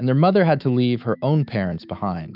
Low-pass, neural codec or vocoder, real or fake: 5.4 kHz; autoencoder, 48 kHz, 128 numbers a frame, DAC-VAE, trained on Japanese speech; fake